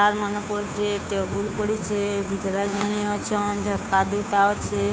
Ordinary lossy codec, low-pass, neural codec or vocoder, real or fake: none; none; codec, 16 kHz, 2 kbps, FunCodec, trained on Chinese and English, 25 frames a second; fake